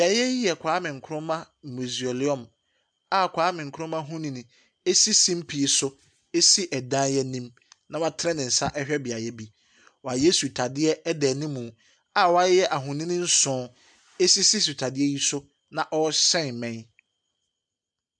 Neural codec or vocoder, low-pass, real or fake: none; 9.9 kHz; real